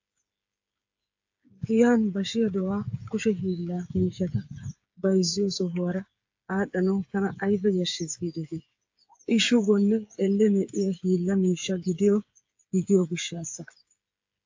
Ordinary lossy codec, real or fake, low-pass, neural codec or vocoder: AAC, 48 kbps; fake; 7.2 kHz; codec, 16 kHz, 8 kbps, FreqCodec, smaller model